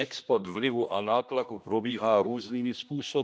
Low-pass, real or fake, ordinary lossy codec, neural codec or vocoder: none; fake; none; codec, 16 kHz, 1 kbps, X-Codec, HuBERT features, trained on general audio